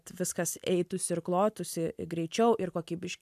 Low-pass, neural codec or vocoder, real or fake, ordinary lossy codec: 14.4 kHz; none; real; AAC, 96 kbps